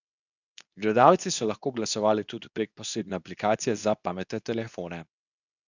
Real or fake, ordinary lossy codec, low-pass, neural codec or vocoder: fake; none; 7.2 kHz; codec, 24 kHz, 0.9 kbps, WavTokenizer, medium speech release version 2